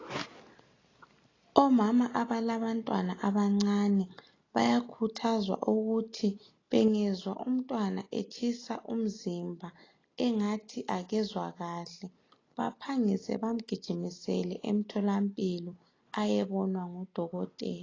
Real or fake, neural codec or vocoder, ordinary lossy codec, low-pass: real; none; AAC, 32 kbps; 7.2 kHz